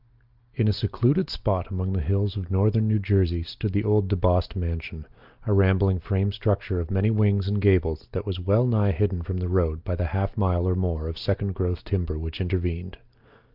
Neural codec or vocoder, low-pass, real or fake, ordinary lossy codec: none; 5.4 kHz; real; Opus, 32 kbps